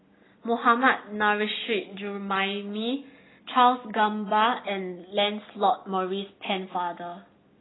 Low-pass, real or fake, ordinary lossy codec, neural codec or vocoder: 7.2 kHz; real; AAC, 16 kbps; none